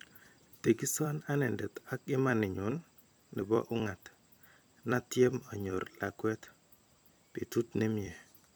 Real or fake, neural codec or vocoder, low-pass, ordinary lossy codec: fake; vocoder, 44.1 kHz, 128 mel bands every 256 samples, BigVGAN v2; none; none